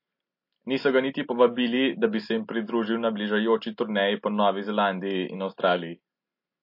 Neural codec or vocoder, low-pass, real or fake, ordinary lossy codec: none; 5.4 kHz; real; MP3, 32 kbps